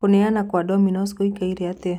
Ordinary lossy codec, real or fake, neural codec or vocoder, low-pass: none; real; none; 19.8 kHz